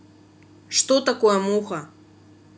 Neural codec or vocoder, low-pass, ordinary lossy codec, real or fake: none; none; none; real